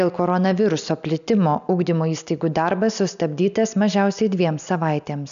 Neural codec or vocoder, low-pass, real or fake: none; 7.2 kHz; real